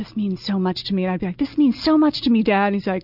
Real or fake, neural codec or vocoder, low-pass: real; none; 5.4 kHz